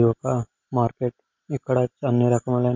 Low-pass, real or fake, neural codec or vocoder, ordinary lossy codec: 7.2 kHz; real; none; MP3, 48 kbps